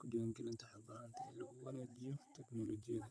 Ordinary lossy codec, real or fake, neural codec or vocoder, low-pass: none; fake; vocoder, 22.05 kHz, 80 mel bands, WaveNeXt; none